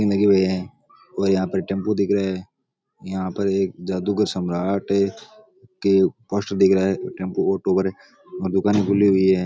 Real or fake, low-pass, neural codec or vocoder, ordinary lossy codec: real; none; none; none